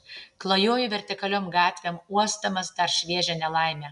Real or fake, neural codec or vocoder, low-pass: real; none; 10.8 kHz